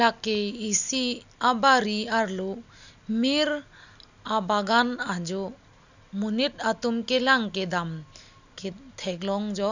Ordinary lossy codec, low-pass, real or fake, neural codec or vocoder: none; 7.2 kHz; real; none